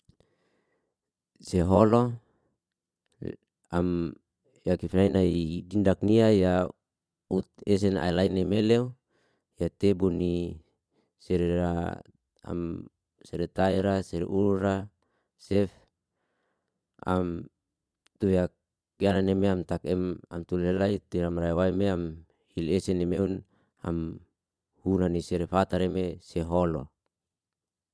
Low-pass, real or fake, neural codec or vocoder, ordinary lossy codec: none; fake; vocoder, 22.05 kHz, 80 mel bands, Vocos; none